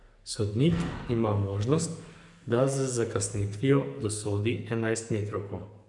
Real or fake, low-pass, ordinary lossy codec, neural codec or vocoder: fake; 10.8 kHz; none; codec, 44.1 kHz, 2.6 kbps, SNAC